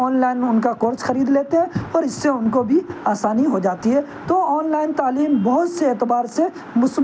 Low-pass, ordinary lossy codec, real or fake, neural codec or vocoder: none; none; real; none